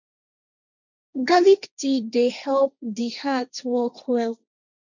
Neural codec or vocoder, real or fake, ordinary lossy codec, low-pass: codec, 16 kHz, 1.1 kbps, Voila-Tokenizer; fake; none; 7.2 kHz